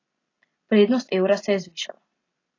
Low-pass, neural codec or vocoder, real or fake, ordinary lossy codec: 7.2 kHz; none; real; AAC, 32 kbps